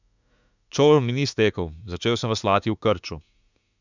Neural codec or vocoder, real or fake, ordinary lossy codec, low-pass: autoencoder, 48 kHz, 32 numbers a frame, DAC-VAE, trained on Japanese speech; fake; none; 7.2 kHz